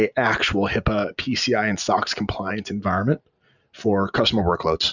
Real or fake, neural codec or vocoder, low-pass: real; none; 7.2 kHz